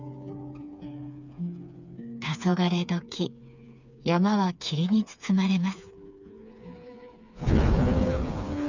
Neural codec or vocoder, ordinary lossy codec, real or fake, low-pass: codec, 16 kHz, 4 kbps, FreqCodec, smaller model; none; fake; 7.2 kHz